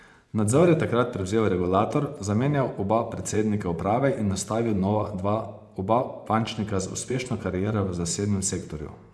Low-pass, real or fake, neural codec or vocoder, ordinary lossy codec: none; fake; vocoder, 24 kHz, 100 mel bands, Vocos; none